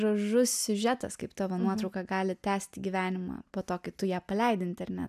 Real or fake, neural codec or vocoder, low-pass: real; none; 14.4 kHz